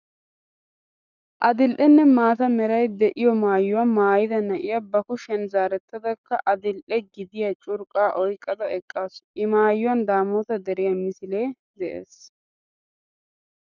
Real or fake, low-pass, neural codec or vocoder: fake; 7.2 kHz; codec, 44.1 kHz, 7.8 kbps, Pupu-Codec